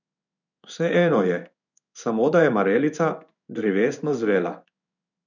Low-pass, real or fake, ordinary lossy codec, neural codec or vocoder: 7.2 kHz; fake; none; codec, 16 kHz in and 24 kHz out, 1 kbps, XY-Tokenizer